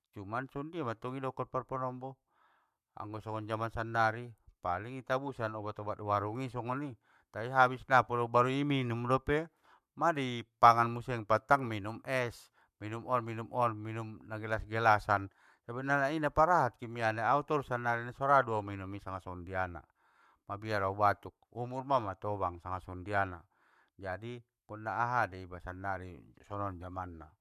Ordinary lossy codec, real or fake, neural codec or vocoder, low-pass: MP3, 96 kbps; fake; codec, 44.1 kHz, 7.8 kbps, Pupu-Codec; 14.4 kHz